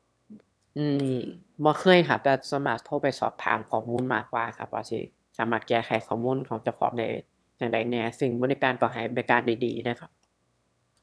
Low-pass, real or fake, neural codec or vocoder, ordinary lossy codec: none; fake; autoencoder, 22.05 kHz, a latent of 192 numbers a frame, VITS, trained on one speaker; none